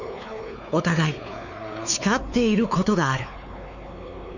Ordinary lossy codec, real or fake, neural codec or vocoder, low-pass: none; fake; codec, 16 kHz, 4 kbps, X-Codec, WavLM features, trained on Multilingual LibriSpeech; 7.2 kHz